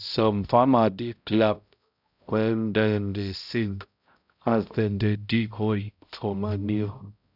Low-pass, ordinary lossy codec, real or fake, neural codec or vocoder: 5.4 kHz; none; fake; codec, 16 kHz, 0.5 kbps, X-Codec, HuBERT features, trained on balanced general audio